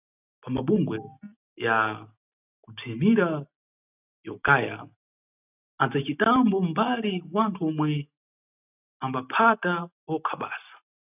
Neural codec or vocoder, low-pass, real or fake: none; 3.6 kHz; real